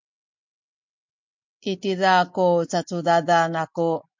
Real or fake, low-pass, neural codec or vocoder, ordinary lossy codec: real; 7.2 kHz; none; MP3, 48 kbps